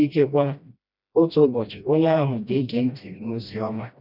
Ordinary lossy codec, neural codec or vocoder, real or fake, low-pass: none; codec, 16 kHz, 1 kbps, FreqCodec, smaller model; fake; 5.4 kHz